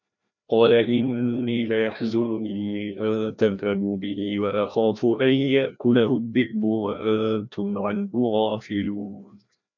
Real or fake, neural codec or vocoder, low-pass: fake; codec, 16 kHz, 1 kbps, FreqCodec, larger model; 7.2 kHz